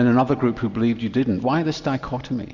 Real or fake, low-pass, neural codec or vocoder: real; 7.2 kHz; none